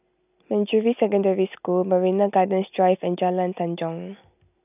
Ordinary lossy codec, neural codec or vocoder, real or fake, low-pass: none; none; real; 3.6 kHz